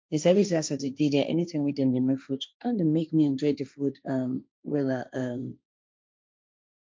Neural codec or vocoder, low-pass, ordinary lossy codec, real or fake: codec, 16 kHz, 1.1 kbps, Voila-Tokenizer; none; none; fake